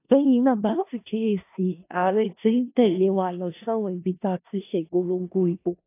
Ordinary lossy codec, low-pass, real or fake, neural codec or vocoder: AAC, 24 kbps; 3.6 kHz; fake; codec, 16 kHz in and 24 kHz out, 0.4 kbps, LongCat-Audio-Codec, four codebook decoder